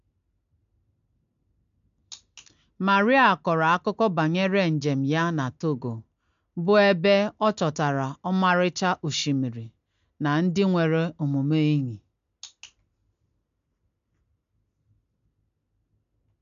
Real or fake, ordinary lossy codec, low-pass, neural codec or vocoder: real; MP3, 64 kbps; 7.2 kHz; none